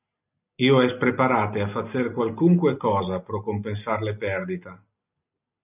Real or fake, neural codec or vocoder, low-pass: real; none; 3.6 kHz